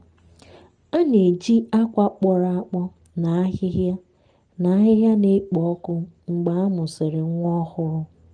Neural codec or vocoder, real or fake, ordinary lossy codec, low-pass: none; real; Opus, 24 kbps; 9.9 kHz